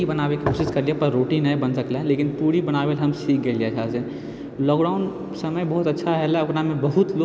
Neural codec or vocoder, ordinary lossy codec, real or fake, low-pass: none; none; real; none